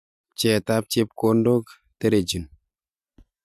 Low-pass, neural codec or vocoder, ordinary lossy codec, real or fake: 14.4 kHz; none; MP3, 96 kbps; real